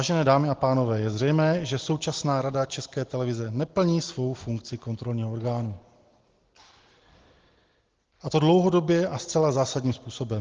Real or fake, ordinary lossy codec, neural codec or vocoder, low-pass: real; Opus, 16 kbps; none; 7.2 kHz